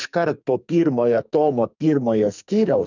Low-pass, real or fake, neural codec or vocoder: 7.2 kHz; fake; codec, 44.1 kHz, 2.6 kbps, SNAC